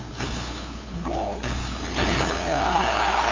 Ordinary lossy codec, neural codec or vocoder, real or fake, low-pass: AAC, 32 kbps; codec, 16 kHz, 2 kbps, FunCodec, trained on LibriTTS, 25 frames a second; fake; 7.2 kHz